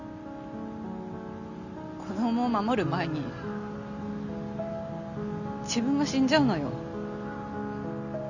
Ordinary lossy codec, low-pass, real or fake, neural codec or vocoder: none; 7.2 kHz; real; none